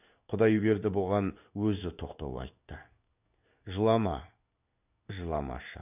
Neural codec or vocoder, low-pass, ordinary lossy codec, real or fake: codec, 16 kHz in and 24 kHz out, 1 kbps, XY-Tokenizer; 3.6 kHz; none; fake